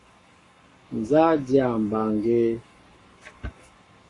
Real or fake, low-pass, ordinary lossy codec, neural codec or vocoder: fake; 10.8 kHz; AAC, 32 kbps; codec, 44.1 kHz, 7.8 kbps, Pupu-Codec